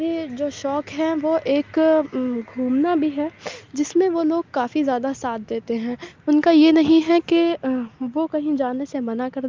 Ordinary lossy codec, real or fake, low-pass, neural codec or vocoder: Opus, 24 kbps; real; 7.2 kHz; none